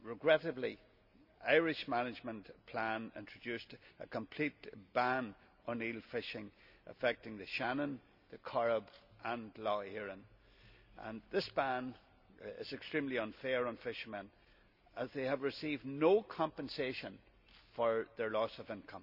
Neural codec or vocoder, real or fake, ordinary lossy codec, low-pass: none; real; none; 5.4 kHz